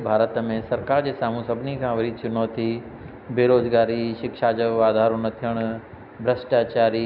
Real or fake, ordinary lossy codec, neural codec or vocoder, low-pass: real; none; none; 5.4 kHz